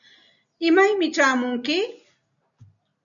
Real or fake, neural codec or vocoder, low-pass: real; none; 7.2 kHz